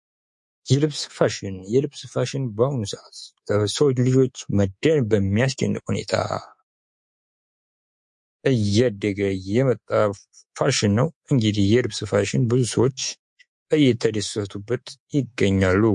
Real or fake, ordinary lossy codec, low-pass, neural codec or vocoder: fake; MP3, 48 kbps; 10.8 kHz; codec, 24 kHz, 3.1 kbps, DualCodec